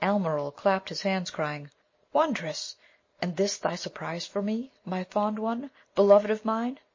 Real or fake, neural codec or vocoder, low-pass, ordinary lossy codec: real; none; 7.2 kHz; MP3, 32 kbps